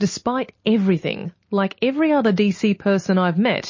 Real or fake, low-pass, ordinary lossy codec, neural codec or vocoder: real; 7.2 kHz; MP3, 32 kbps; none